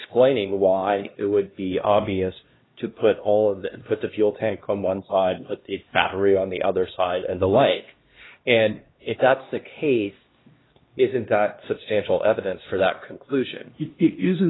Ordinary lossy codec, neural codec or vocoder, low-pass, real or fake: AAC, 16 kbps; codec, 16 kHz, 1 kbps, X-Codec, HuBERT features, trained on LibriSpeech; 7.2 kHz; fake